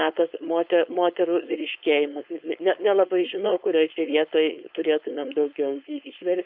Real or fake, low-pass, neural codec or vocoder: fake; 5.4 kHz; codec, 16 kHz, 4.8 kbps, FACodec